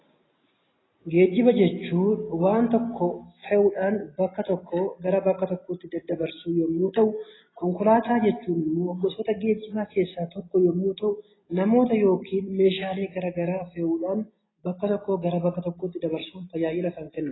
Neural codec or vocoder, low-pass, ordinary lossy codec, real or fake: none; 7.2 kHz; AAC, 16 kbps; real